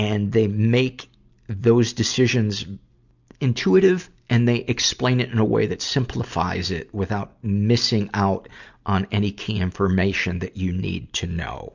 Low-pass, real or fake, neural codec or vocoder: 7.2 kHz; real; none